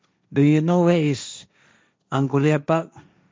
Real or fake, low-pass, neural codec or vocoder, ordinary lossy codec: fake; none; codec, 16 kHz, 1.1 kbps, Voila-Tokenizer; none